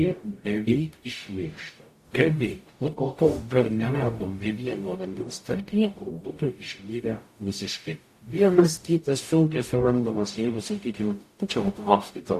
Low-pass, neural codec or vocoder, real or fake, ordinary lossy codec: 14.4 kHz; codec, 44.1 kHz, 0.9 kbps, DAC; fake; AAC, 64 kbps